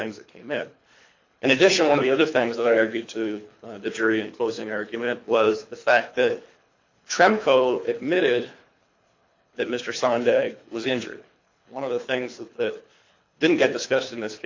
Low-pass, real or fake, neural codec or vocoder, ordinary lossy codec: 7.2 kHz; fake; codec, 24 kHz, 3 kbps, HILCodec; MP3, 48 kbps